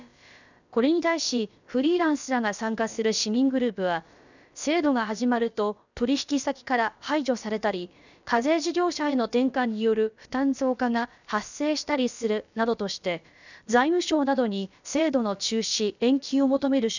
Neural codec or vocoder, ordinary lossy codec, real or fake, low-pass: codec, 16 kHz, about 1 kbps, DyCAST, with the encoder's durations; none; fake; 7.2 kHz